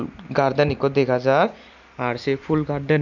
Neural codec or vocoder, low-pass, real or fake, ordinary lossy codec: none; 7.2 kHz; real; none